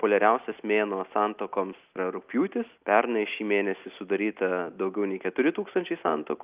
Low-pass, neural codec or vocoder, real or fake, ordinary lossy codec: 3.6 kHz; none; real; Opus, 24 kbps